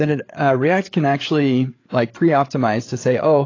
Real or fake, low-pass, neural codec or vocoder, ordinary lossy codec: fake; 7.2 kHz; codec, 16 kHz, 8 kbps, FreqCodec, larger model; AAC, 32 kbps